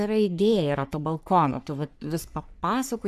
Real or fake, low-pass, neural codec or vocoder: fake; 14.4 kHz; codec, 44.1 kHz, 3.4 kbps, Pupu-Codec